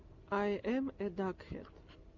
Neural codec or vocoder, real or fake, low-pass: none; real; 7.2 kHz